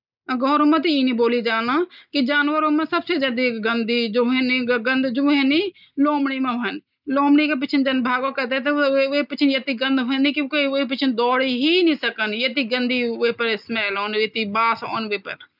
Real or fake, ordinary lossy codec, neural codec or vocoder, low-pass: real; none; none; 5.4 kHz